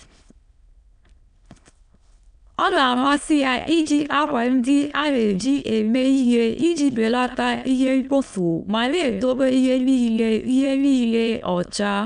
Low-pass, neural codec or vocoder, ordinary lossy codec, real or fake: 9.9 kHz; autoencoder, 22.05 kHz, a latent of 192 numbers a frame, VITS, trained on many speakers; none; fake